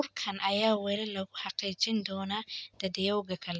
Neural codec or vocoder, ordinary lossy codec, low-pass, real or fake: none; none; none; real